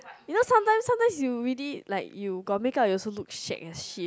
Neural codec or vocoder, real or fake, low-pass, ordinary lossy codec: none; real; none; none